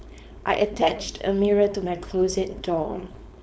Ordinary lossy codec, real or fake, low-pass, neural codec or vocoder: none; fake; none; codec, 16 kHz, 4.8 kbps, FACodec